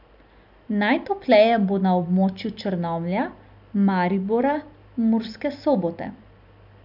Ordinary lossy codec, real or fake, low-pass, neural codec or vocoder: none; real; 5.4 kHz; none